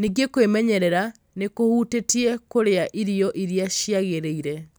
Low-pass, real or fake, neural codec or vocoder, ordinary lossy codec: none; real; none; none